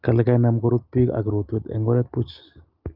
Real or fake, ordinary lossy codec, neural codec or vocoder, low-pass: real; Opus, 32 kbps; none; 5.4 kHz